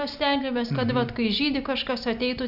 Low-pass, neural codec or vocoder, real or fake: 5.4 kHz; none; real